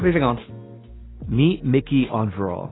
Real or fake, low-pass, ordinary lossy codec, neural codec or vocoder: real; 7.2 kHz; AAC, 16 kbps; none